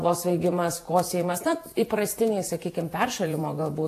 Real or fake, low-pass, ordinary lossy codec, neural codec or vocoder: fake; 14.4 kHz; AAC, 64 kbps; vocoder, 48 kHz, 128 mel bands, Vocos